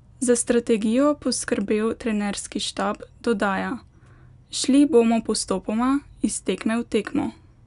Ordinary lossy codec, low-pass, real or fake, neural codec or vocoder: none; 10.8 kHz; real; none